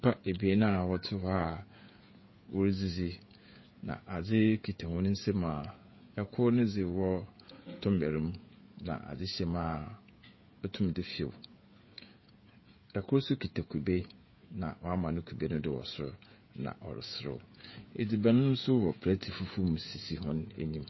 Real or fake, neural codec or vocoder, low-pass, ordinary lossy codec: fake; codec, 16 kHz, 16 kbps, FreqCodec, smaller model; 7.2 kHz; MP3, 24 kbps